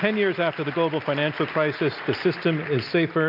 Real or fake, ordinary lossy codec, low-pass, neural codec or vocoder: real; MP3, 48 kbps; 5.4 kHz; none